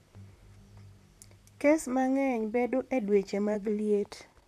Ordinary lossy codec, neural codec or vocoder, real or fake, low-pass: none; vocoder, 44.1 kHz, 128 mel bands, Pupu-Vocoder; fake; 14.4 kHz